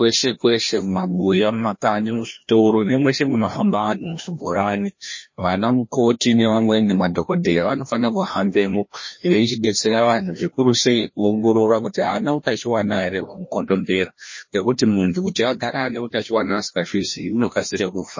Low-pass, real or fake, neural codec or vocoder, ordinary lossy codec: 7.2 kHz; fake; codec, 16 kHz, 1 kbps, FreqCodec, larger model; MP3, 32 kbps